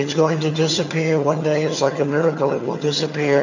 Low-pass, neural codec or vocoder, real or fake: 7.2 kHz; vocoder, 22.05 kHz, 80 mel bands, HiFi-GAN; fake